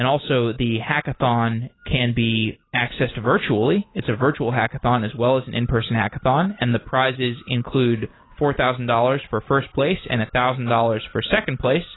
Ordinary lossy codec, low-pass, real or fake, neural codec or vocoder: AAC, 16 kbps; 7.2 kHz; real; none